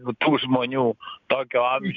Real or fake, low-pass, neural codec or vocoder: real; 7.2 kHz; none